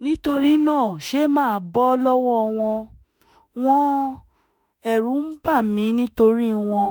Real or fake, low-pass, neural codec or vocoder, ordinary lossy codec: fake; none; autoencoder, 48 kHz, 32 numbers a frame, DAC-VAE, trained on Japanese speech; none